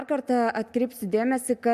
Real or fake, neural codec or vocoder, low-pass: real; none; 14.4 kHz